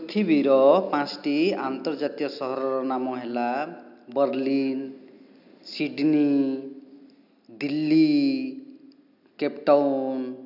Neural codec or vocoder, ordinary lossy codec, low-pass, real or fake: none; none; 5.4 kHz; real